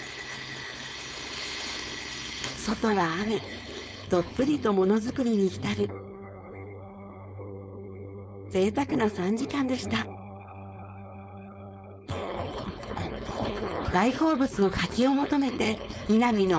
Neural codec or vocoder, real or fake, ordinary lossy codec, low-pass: codec, 16 kHz, 4.8 kbps, FACodec; fake; none; none